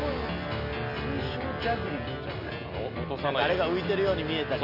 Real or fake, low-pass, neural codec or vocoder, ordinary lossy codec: real; 5.4 kHz; none; none